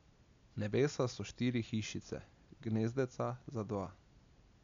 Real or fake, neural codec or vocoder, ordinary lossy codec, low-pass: real; none; MP3, 64 kbps; 7.2 kHz